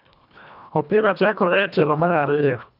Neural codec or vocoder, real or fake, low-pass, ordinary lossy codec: codec, 24 kHz, 1.5 kbps, HILCodec; fake; 5.4 kHz; none